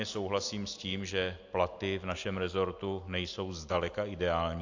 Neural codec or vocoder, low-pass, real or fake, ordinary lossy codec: none; 7.2 kHz; real; AAC, 48 kbps